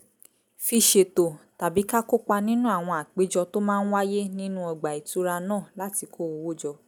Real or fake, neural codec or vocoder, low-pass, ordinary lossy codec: real; none; none; none